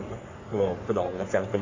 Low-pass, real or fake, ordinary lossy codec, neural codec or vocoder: 7.2 kHz; fake; AAC, 32 kbps; codec, 44.1 kHz, 3.4 kbps, Pupu-Codec